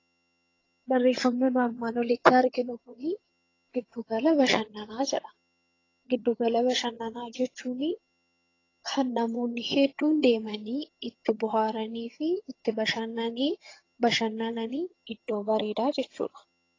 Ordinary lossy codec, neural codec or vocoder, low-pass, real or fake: AAC, 32 kbps; vocoder, 22.05 kHz, 80 mel bands, HiFi-GAN; 7.2 kHz; fake